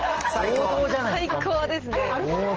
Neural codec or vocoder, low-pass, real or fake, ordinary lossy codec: none; 7.2 kHz; real; Opus, 16 kbps